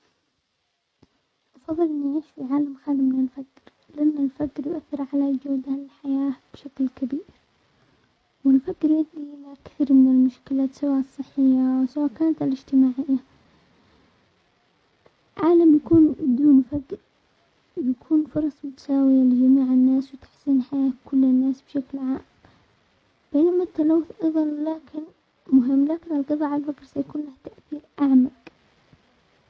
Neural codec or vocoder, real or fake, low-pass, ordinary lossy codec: none; real; none; none